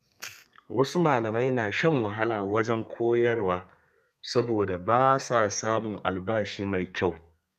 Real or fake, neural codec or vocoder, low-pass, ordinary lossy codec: fake; codec, 32 kHz, 1.9 kbps, SNAC; 14.4 kHz; none